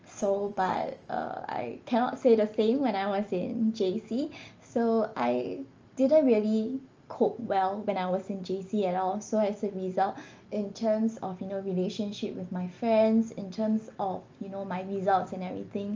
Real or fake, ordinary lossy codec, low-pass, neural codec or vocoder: real; Opus, 24 kbps; 7.2 kHz; none